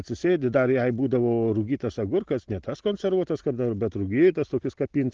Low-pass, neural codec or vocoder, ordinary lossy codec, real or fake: 7.2 kHz; none; Opus, 16 kbps; real